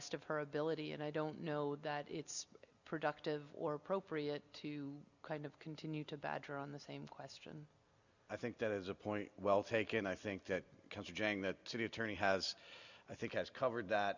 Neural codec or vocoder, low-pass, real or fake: none; 7.2 kHz; real